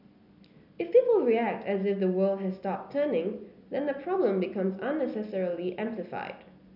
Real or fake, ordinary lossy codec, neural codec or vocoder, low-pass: real; none; none; 5.4 kHz